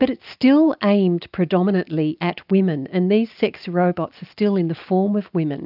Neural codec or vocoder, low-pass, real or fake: none; 5.4 kHz; real